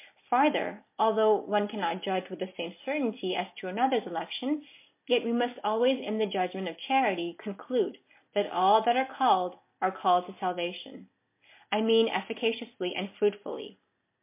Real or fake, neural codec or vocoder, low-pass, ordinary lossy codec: real; none; 3.6 kHz; MP3, 24 kbps